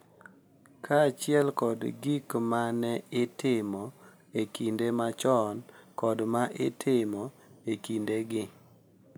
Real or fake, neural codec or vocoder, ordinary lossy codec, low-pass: real; none; none; none